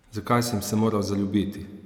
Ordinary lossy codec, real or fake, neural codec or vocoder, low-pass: none; real; none; 19.8 kHz